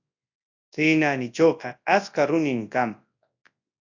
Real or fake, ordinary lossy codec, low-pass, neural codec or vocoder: fake; AAC, 48 kbps; 7.2 kHz; codec, 24 kHz, 0.9 kbps, WavTokenizer, large speech release